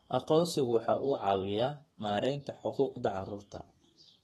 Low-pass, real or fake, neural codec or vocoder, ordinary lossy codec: 14.4 kHz; fake; codec, 32 kHz, 1.9 kbps, SNAC; AAC, 32 kbps